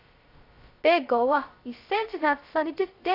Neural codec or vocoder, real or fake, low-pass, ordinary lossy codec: codec, 16 kHz, 0.2 kbps, FocalCodec; fake; 5.4 kHz; AAC, 32 kbps